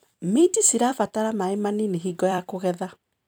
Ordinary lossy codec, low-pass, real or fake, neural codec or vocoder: none; none; fake; vocoder, 44.1 kHz, 128 mel bands every 256 samples, BigVGAN v2